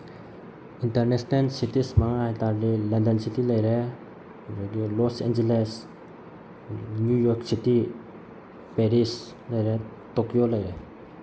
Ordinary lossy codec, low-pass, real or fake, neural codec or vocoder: none; none; real; none